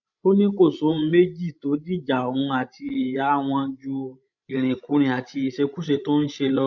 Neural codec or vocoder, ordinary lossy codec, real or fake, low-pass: codec, 16 kHz, 16 kbps, FreqCodec, larger model; none; fake; 7.2 kHz